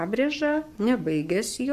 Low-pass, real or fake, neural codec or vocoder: 14.4 kHz; fake; codec, 44.1 kHz, 7.8 kbps, Pupu-Codec